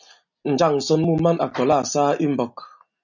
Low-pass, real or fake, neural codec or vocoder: 7.2 kHz; real; none